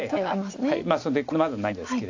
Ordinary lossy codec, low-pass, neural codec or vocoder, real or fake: AAC, 48 kbps; 7.2 kHz; none; real